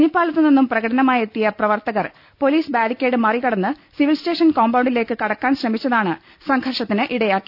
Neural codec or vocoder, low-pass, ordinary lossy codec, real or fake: none; 5.4 kHz; none; real